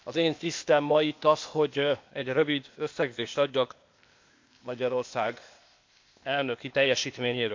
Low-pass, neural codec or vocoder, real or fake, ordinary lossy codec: 7.2 kHz; codec, 16 kHz, 0.8 kbps, ZipCodec; fake; MP3, 64 kbps